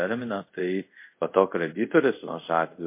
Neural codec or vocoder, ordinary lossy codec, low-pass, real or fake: codec, 24 kHz, 0.5 kbps, DualCodec; MP3, 24 kbps; 3.6 kHz; fake